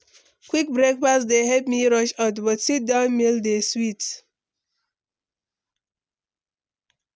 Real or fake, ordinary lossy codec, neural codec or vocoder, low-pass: real; none; none; none